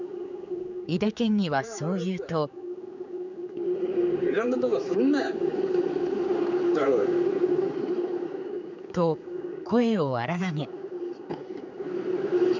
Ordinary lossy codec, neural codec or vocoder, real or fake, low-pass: none; codec, 16 kHz, 4 kbps, X-Codec, HuBERT features, trained on general audio; fake; 7.2 kHz